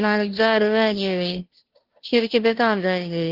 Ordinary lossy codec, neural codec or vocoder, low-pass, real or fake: Opus, 16 kbps; codec, 16 kHz, 0.5 kbps, FunCodec, trained on Chinese and English, 25 frames a second; 5.4 kHz; fake